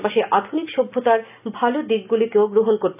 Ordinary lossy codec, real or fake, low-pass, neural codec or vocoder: none; real; 3.6 kHz; none